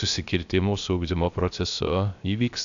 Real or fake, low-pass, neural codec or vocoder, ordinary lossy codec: fake; 7.2 kHz; codec, 16 kHz, 0.3 kbps, FocalCodec; AAC, 64 kbps